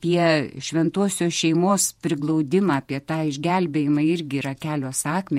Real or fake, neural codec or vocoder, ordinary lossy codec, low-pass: fake; vocoder, 48 kHz, 128 mel bands, Vocos; MP3, 64 kbps; 19.8 kHz